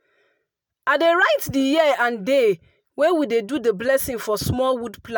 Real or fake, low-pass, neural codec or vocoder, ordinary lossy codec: real; none; none; none